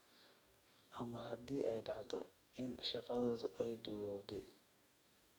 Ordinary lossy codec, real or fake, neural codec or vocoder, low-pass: none; fake; codec, 44.1 kHz, 2.6 kbps, DAC; none